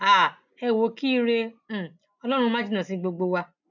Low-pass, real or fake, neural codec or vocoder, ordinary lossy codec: 7.2 kHz; real; none; none